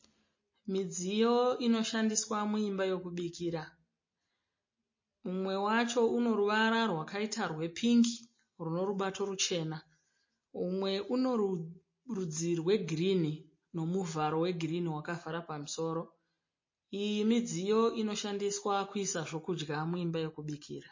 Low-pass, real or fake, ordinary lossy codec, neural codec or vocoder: 7.2 kHz; real; MP3, 32 kbps; none